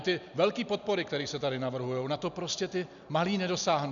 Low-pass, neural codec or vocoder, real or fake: 7.2 kHz; none; real